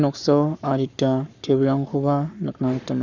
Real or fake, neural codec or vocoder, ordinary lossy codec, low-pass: fake; codec, 44.1 kHz, 7.8 kbps, Pupu-Codec; none; 7.2 kHz